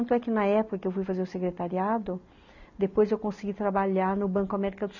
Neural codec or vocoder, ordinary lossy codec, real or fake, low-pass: none; none; real; 7.2 kHz